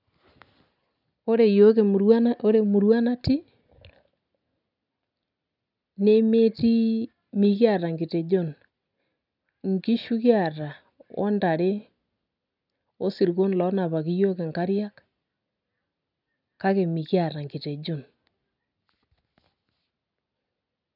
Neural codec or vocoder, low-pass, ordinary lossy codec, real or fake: none; 5.4 kHz; none; real